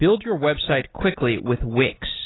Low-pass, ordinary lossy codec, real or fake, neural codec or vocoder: 7.2 kHz; AAC, 16 kbps; real; none